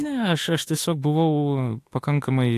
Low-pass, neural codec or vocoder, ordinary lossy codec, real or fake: 14.4 kHz; autoencoder, 48 kHz, 32 numbers a frame, DAC-VAE, trained on Japanese speech; AAC, 64 kbps; fake